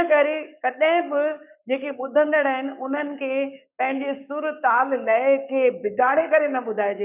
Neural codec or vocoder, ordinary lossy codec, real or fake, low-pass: codec, 44.1 kHz, 7.8 kbps, Pupu-Codec; none; fake; 3.6 kHz